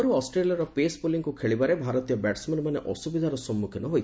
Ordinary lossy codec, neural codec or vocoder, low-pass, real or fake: none; none; none; real